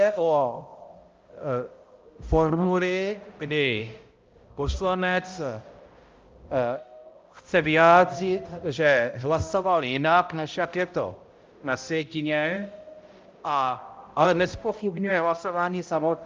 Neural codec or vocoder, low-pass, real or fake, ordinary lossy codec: codec, 16 kHz, 0.5 kbps, X-Codec, HuBERT features, trained on balanced general audio; 7.2 kHz; fake; Opus, 24 kbps